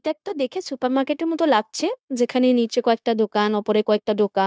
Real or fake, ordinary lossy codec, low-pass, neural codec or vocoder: fake; none; none; codec, 16 kHz, 0.9 kbps, LongCat-Audio-Codec